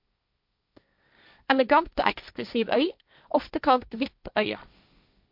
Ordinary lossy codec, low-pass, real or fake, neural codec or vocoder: MP3, 48 kbps; 5.4 kHz; fake; codec, 16 kHz, 1.1 kbps, Voila-Tokenizer